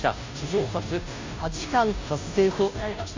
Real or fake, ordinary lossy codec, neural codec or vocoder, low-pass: fake; AAC, 48 kbps; codec, 16 kHz, 0.5 kbps, FunCodec, trained on Chinese and English, 25 frames a second; 7.2 kHz